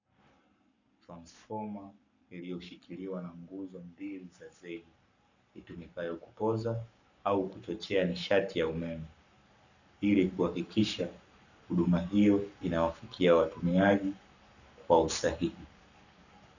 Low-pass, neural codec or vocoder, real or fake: 7.2 kHz; codec, 44.1 kHz, 7.8 kbps, Pupu-Codec; fake